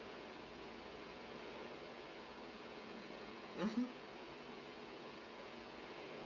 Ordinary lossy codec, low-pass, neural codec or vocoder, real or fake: none; 7.2 kHz; codec, 16 kHz, 16 kbps, FreqCodec, smaller model; fake